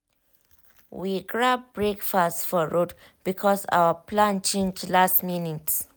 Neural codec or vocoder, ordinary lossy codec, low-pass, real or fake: none; none; none; real